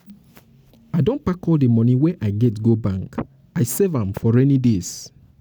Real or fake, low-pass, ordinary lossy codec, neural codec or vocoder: real; none; none; none